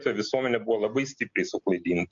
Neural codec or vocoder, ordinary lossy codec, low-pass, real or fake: none; MP3, 48 kbps; 7.2 kHz; real